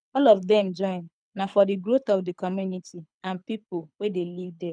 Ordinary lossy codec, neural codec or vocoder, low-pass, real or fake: none; codec, 24 kHz, 6 kbps, HILCodec; 9.9 kHz; fake